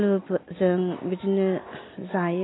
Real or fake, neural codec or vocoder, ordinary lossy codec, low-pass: real; none; AAC, 16 kbps; 7.2 kHz